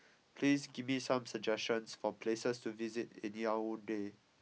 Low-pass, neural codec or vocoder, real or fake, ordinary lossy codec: none; none; real; none